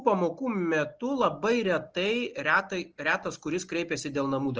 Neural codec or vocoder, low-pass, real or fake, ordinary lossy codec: none; 7.2 kHz; real; Opus, 24 kbps